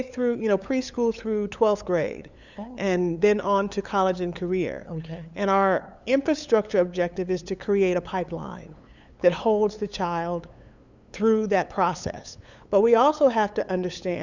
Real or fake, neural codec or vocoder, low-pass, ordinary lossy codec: fake; codec, 16 kHz, 8 kbps, FunCodec, trained on LibriTTS, 25 frames a second; 7.2 kHz; Opus, 64 kbps